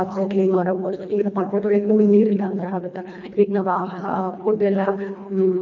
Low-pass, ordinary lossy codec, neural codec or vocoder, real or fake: 7.2 kHz; none; codec, 24 kHz, 1.5 kbps, HILCodec; fake